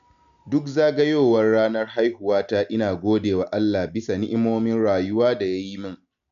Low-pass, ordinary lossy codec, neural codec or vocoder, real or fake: 7.2 kHz; none; none; real